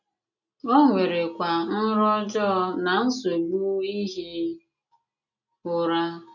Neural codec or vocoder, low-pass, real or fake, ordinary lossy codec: none; 7.2 kHz; real; none